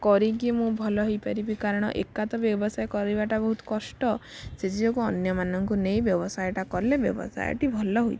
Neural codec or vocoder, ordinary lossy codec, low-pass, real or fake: none; none; none; real